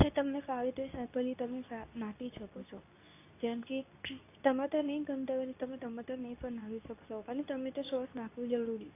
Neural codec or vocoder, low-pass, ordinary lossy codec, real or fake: codec, 24 kHz, 0.9 kbps, WavTokenizer, medium speech release version 2; 3.6 kHz; AAC, 24 kbps; fake